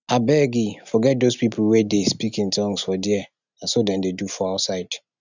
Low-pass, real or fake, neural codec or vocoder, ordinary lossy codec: 7.2 kHz; real; none; none